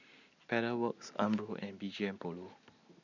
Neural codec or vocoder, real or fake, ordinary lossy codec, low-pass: none; real; none; 7.2 kHz